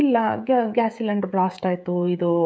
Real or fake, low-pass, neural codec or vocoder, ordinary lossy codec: fake; none; codec, 16 kHz, 16 kbps, FreqCodec, smaller model; none